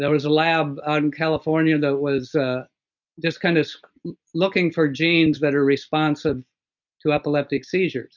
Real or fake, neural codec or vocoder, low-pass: real; none; 7.2 kHz